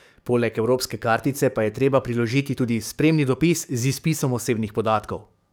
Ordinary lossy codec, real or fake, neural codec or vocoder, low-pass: none; fake; codec, 44.1 kHz, 7.8 kbps, DAC; none